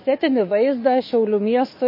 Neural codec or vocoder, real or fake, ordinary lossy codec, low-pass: autoencoder, 48 kHz, 128 numbers a frame, DAC-VAE, trained on Japanese speech; fake; MP3, 24 kbps; 5.4 kHz